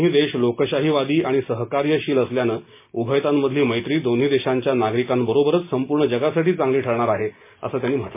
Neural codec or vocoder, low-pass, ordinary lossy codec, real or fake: none; 3.6 kHz; MP3, 24 kbps; real